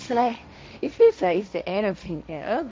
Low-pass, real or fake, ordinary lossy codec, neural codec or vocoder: none; fake; none; codec, 16 kHz, 1.1 kbps, Voila-Tokenizer